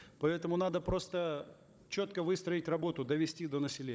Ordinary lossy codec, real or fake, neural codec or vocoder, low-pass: none; fake; codec, 16 kHz, 16 kbps, FunCodec, trained on Chinese and English, 50 frames a second; none